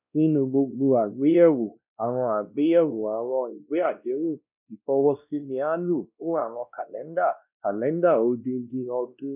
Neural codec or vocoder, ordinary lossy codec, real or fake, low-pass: codec, 16 kHz, 1 kbps, X-Codec, WavLM features, trained on Multilingual LibriSpeech; none; fake; 3.6 kHz